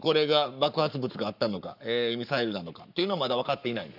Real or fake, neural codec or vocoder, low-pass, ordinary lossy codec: fake; codec, 44.1 kHz, 7.8 kbps, Pupu-Codec; 5.4 kHz; none